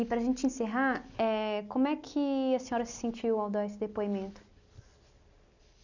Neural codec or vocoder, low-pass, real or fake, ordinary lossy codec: none; 7.2 kHz; real; none